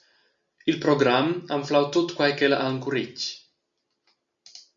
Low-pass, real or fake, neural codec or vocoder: 7.2 kHz; real; none